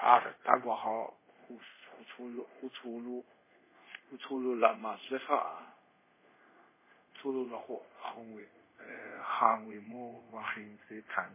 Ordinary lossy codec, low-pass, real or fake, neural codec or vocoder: MP3, 16 kbps; 3.6 kHz; fake; codec, 24 kHz, 0.5 kbps, DualCodec